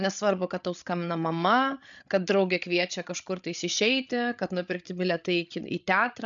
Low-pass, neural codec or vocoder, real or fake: 7.2 kHz; codec, 16 kHz, 8 kbps, FreqCodec, larger model; fake